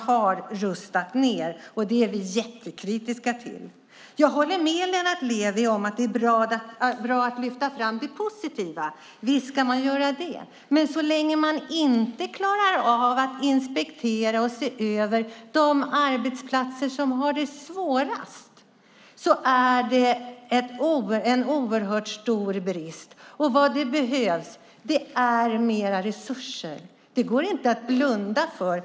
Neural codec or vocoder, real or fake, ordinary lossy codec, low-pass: none; real; none; none